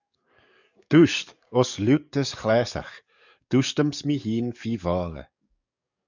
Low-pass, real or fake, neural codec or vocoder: 7.2 kHz; fake; codec, 44.1 kHz, 7.8 kbps, DAC